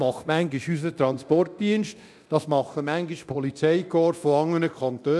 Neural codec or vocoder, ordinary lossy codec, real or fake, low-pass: codec, 24 kHz, 0.9 kbps, DualCodec; none; fake; none